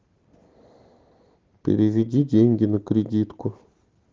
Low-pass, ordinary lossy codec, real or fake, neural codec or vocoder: 7.2 kHz; Opus, 16 kbps; real; none